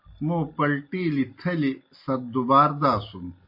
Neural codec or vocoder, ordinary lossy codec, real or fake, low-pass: none; MP3, 32 kbps; real; 5.4 kHz